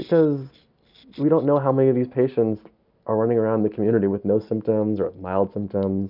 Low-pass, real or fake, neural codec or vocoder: 5.4 kHz; real; none